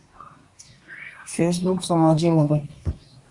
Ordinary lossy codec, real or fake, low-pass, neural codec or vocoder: Opus, 64 kbps; fake; 10.8 kHz; codec, 24 kHz, 1 kbps, SNAC